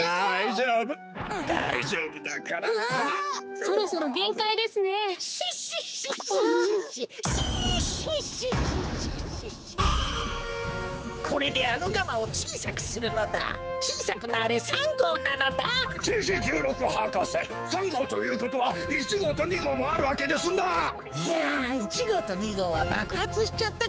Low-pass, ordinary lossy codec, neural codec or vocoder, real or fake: none; none; codec, 16 kHz, 4 kbps, X-Codec, HuBERT features, trained on general audio; fake